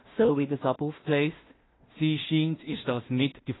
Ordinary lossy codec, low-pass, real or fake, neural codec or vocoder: AAC, 16 kbps; 7.2 kHz; fake; codec, 16 kHz in and 24 kHz out, 0.4 kbps, LongCat-Audio-Codec, two codebook decoder